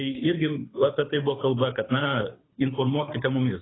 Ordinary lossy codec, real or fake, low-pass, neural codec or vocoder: AAC, 16 kbps; fake; 7.2 kHz; codec, 24 kHz, 6 kbps, HILCodec